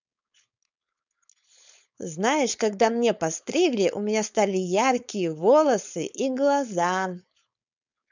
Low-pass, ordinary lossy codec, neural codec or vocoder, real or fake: 7.2 kHz; none; codec, 16 kHz, 4.8 kbps, FACodec; fake